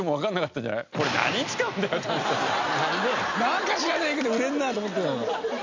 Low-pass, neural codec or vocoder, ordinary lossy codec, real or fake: 7.2 kHz; none; MP3, 64 kbps; real